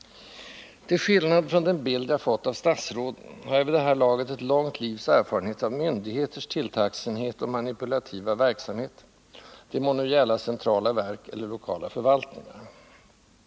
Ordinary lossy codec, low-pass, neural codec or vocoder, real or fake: none; none; none; real